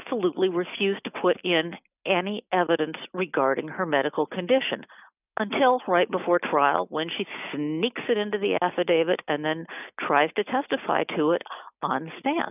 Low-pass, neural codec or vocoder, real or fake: 3.6 kHz; none; real